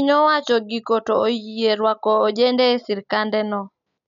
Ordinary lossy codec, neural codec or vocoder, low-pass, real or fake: none; none; 7.2 kHz; real